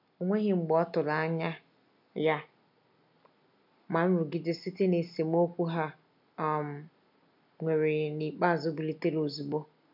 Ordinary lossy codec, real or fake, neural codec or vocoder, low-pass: none; real; none; 5.4 kHz